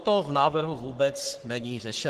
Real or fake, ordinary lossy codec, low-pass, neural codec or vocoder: fake; Opus, 16 kbps; 14.4 kHz; codec, 44.1 kHz, 3.4 kbps, Pupu-Codec